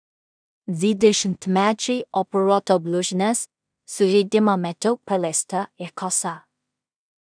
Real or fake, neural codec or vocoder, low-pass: fake; codec, 16 kHz in and 24 kHz out, 0.4 kbps, LongCat-Audio-Codec, two codebook decoder; 9.9 kHz